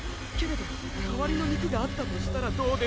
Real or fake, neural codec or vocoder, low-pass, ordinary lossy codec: real; none; none; none